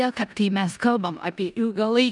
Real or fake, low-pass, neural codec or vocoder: fake; 10.8 kHz; codec, 16 kHz in and 24 kHz out, 0.9 kbps, LongCat-Audio-Codec, four codebook decoder